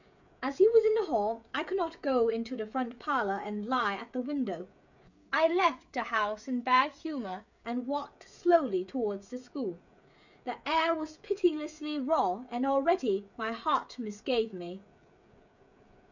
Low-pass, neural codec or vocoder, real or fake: 7.2 kHz; codec, 16 kHz, 16 kbps, FreqCodec, smaller model; fake